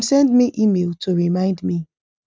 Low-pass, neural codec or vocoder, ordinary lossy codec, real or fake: none; none; none; real